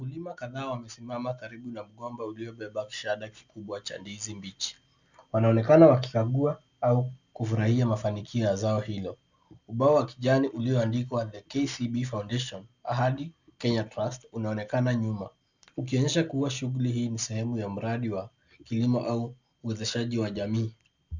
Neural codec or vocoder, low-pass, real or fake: none; 7.2 kHz; real